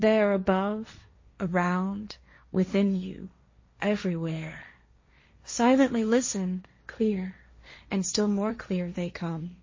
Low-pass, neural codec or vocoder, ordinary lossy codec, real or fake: 7.2 kHz; codec, 16 kHz, 1.1 kbps, Voila-Tokenizer; MP3, 32 kbps; fake